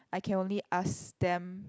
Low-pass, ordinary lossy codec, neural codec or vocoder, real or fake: none; none; none; real